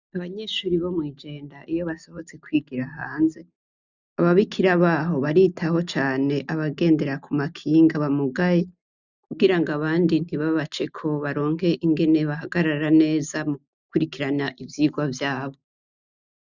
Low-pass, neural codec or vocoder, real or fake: 7.2 kHz; none; real